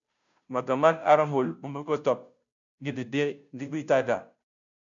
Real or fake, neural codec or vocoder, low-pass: fake; codec, 16 kHz, 0.5 kbps, FunCodec, trained on Chinese and English, 25 frames a second; 7.2 kHz